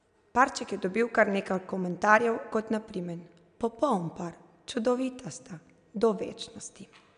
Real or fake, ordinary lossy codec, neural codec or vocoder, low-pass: real; none; none; 9.9 kHz